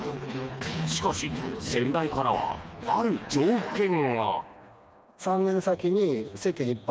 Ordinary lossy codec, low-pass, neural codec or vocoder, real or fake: none; none; codec, 16 kHz, 2 kbps, FreqCodec, smaller model; fake